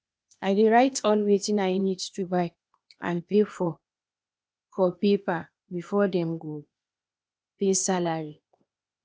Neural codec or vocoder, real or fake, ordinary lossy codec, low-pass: codec, 16 kHz, 0.8 kbps, ZipCodec; fake; none; none